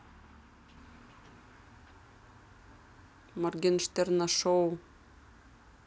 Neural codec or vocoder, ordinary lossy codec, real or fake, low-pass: none; none; real; none